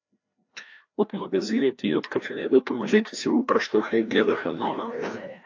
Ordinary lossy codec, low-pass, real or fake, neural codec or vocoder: AAC, 48 kbps; 7.2 kHz; fake; codec, 16 kHz, 1 kbps, FreqCodec, larger model